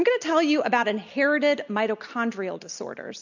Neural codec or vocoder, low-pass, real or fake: none; 7.2 kHz; real